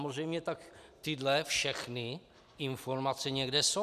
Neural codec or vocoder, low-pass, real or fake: none; 14.4 kHz; real